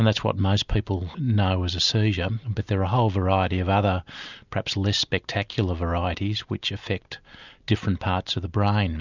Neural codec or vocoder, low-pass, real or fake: none; 7.2 kHz; real